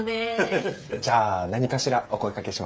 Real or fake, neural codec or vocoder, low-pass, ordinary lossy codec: fake; codec, 16 kHz, 16 kbps, FreqCodec, smaller model; none; none